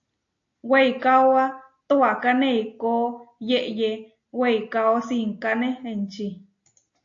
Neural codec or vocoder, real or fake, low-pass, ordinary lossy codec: none; real; 7.2 kHz; AAC, 48 kbps